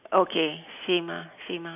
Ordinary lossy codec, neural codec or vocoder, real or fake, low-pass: none; none; real; 3.6 kHz